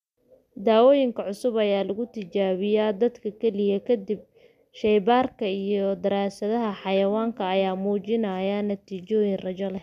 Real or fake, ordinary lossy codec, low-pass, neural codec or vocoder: real; MP3, 96 kbps; 14.4 kHz; none